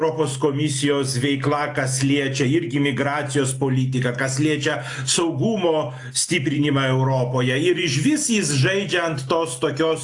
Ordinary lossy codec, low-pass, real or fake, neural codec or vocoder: AAC, 64 kbps; 10.8 kHz; real; none